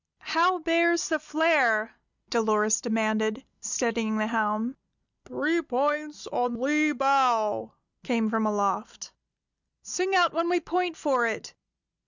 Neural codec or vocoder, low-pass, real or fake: none; 7.2 kHz; real